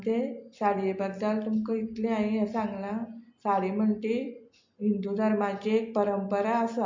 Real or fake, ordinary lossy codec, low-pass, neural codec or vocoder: real; MP3, 48 kbps; 7.2 kHz; none